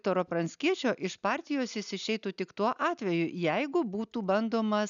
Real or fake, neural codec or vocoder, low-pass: real; none; 7.2 kHz